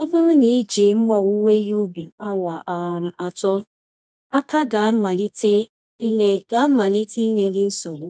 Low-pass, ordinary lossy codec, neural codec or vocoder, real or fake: 9.9 kHz; none; codec, 24 kHz, 0.9 kbps, WavTokenizer, medium music audio release; fake